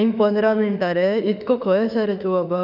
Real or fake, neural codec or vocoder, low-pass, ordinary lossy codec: fake; autoencoder, 48 kHz, 32 numbers a frame, DAC-VAE, trained on Japanese speech; 5.4 kHz; none